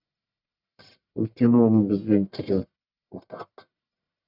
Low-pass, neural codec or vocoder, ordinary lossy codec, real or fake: 5.4 kHz; codec, 44.1 kHz, 1.7 kbps, Pupu-Codec; none; fake